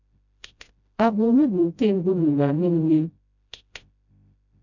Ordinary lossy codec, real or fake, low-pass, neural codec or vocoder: none; fake; 7.2 kHz; codec, 16 kHz, 0.5 kbps, FreqCodec, smaller model